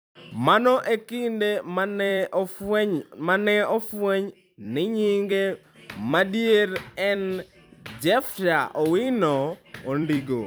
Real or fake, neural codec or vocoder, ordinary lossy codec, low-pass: fake; vocoder, 44.1 kHz, 128 mel bands every 256 samples, BigVGAN v2; none; none